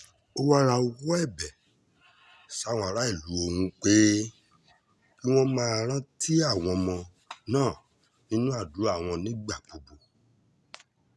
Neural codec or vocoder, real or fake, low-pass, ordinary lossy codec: none; real; none; none